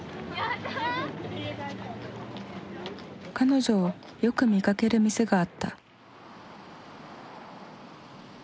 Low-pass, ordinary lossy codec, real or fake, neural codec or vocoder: none; none; real; none